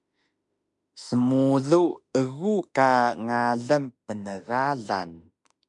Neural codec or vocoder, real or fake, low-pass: autoencoder, 48 kHz, 32 numbers a frame, DAC-VAE, trained on Japanese speech; fake; 10.8 kHz